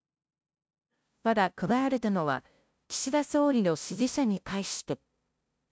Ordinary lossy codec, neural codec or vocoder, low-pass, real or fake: none; codec, 16 kHz, 0.5 kbps, FunCodec, trained on LibriTTS, 25 frames a second; none; fake